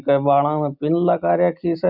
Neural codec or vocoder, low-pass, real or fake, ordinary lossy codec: none; 5.4 kHz; real; none